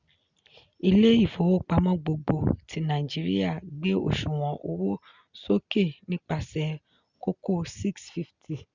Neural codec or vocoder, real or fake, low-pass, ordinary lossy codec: none; real; 7.2 kHz; Opus, 64 kbps